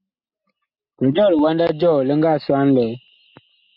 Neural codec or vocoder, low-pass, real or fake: none; 5.4 kHz; real